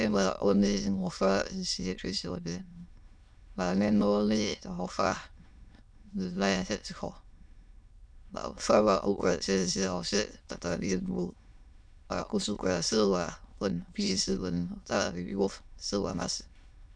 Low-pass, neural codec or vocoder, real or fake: 9.9 kHz; autoencoder, 22.05 kHz, a latent of 192 numbers a frame, VITS, trained on many speakers; fake